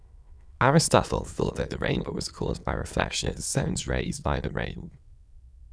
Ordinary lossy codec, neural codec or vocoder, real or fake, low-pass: none; autoencoder, 22.05 kHz, a latent of 192 numbers a frame, VITS, trained on many speakers; fake; none